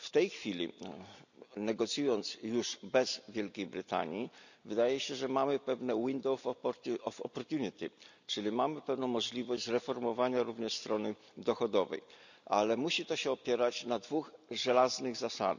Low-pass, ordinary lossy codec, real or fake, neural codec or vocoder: 7.2 kHz; none; real; none